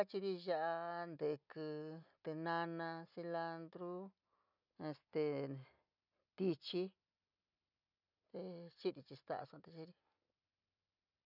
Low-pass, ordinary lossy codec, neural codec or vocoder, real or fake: 5.4 kHz; none; none; real